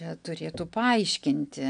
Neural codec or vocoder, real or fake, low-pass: none; real; 9.9 kHz